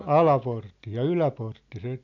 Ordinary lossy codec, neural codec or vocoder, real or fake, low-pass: none; none; real; 7.2 kHz